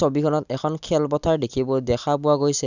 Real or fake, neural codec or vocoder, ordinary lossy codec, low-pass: real; none; none; 7.2 kHz